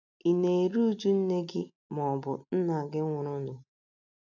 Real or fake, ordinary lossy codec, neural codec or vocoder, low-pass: real; none; none; 7.2 kHz